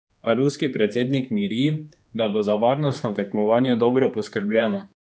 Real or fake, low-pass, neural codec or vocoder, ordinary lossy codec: fake; none; codec, 16 kHz, 2 kbps, X-Codec, HuBERT features, trained on general audio; none